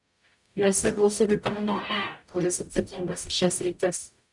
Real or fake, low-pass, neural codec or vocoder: fake; 10.8 kHz; codec, 44.1 kHz, 0.9 kbps, DAC